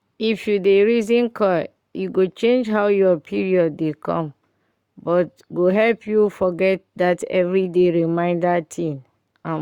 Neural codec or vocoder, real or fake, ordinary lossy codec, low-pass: codec, 44.1 kHz, 7.8 kbps, Pupu-Codec; fake; Opus, 64 kbps; 19.8 kHz